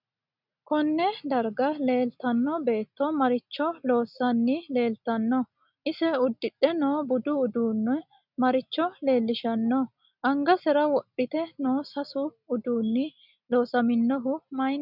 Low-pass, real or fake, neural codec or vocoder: 5.4 kHz; real; none